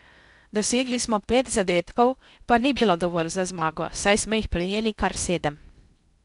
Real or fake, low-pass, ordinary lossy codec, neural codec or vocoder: fake; 10.8 kHz; none; codec, 16 kHz in and 24 kHz out, 0.6 kbps, FocalCodec, streaming, 2048 codes